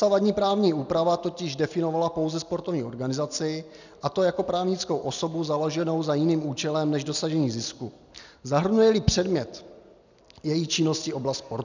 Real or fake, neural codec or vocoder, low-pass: real; none; 7.2 kHz